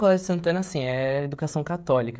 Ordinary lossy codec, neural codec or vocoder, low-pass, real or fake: none; codec, 16 kHz, 16 kbps, FreqCodec, smaller model; none; fake